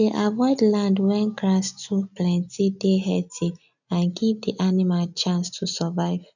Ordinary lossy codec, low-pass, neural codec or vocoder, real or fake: none; 7.2 kHz; none; real